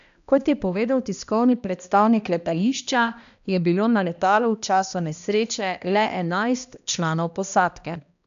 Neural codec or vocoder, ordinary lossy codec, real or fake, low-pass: codec, 16 kHz, 1 kbps, X-Codec, HuBERT features, trained on balanced general audio; AAC, 96 kbps; fake; 7.2 kHz